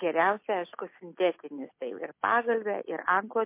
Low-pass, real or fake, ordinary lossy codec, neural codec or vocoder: 3.6 kHz; real; MP3, 24 kbps; none